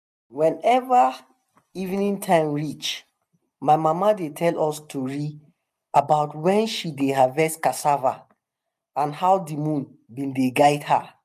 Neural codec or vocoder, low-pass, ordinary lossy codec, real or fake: none; 14.4 kHz; none; real